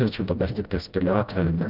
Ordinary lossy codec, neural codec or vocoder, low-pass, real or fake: Opus, 24 kbps; codec, 16 kHz, 0.5 kbps, FreqCodec, smaller model; 5.4 kHz; fake